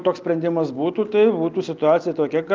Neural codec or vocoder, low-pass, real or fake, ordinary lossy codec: none; 7.2 kHz; real; Opus, 24 kbps